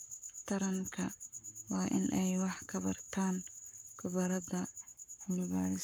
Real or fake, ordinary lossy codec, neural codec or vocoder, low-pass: fake; none; codec, 44.1 kHz, 7.8 kbps, Pupu-Codec; none